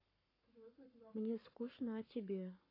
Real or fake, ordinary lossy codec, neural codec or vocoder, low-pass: fake; AAC, 24 kbps; codec, 44.1 kHz, 7.8 kbps, Pupu-Codec; 5.4 kHz